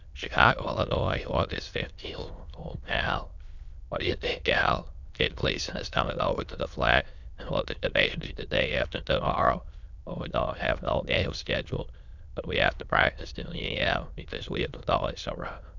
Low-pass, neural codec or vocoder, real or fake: 7.2 kHz; autoencoder, 22.05 kHz, a latent of 192 numbers a frame, VITS, trained on many speakers; fake